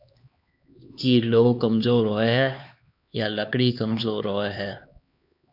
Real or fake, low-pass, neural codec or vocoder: fake; 5.4 kHz; codec, 16 kHz, 2 kbps, X-Codec, HuBERT features, trained on LibriSpeech